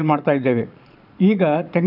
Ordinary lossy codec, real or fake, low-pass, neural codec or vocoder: none; fake; 5.4 kHz; vocoder, 22.05 kHz, 80 mel bands, Vocos